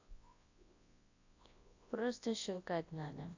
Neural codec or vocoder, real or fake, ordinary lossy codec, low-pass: codec, 24 kHz, 0.9 kbps, WavTokenizer, large speech release; fake; AAC, 48 kbps; 7.2 kHz